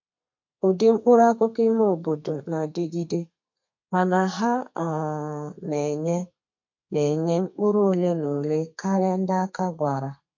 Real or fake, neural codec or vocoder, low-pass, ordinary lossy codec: fake; codec, 32 kHz, 1.9 kbps, SNAC; 7.2 kHz; MP3, 48 kbps